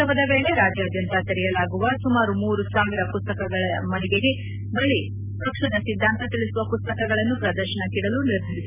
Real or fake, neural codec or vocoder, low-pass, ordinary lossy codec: real; none; 3.6 kHz; none